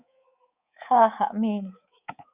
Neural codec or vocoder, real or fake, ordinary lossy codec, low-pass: codec, 24 kHz, 3.1 kbps, DualCodec; fake; Opus, 64 kbps; 3.6 kHz